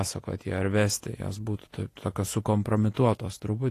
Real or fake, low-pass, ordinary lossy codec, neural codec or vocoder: fake; 14.4 kHz; AAC, 48 kbps; vocoder, 48 kHz, 128 mel bands, Vocos